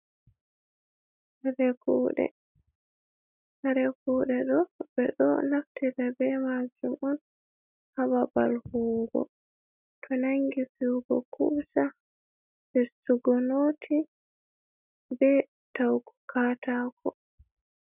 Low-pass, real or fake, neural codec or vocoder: 3.6 kHz; real; none